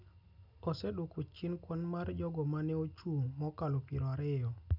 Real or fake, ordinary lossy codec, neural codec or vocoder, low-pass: real; none; none; 5.4 kHz